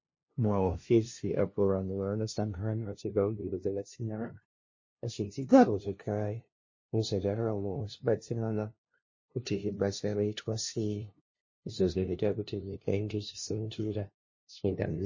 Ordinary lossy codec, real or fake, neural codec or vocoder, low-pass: MP3, 32 kbps; fake; codec, 16 kHz, 0.5 kbps, FunCodec, trained on LibriTTS, 25 frames a second; 7.2 kHz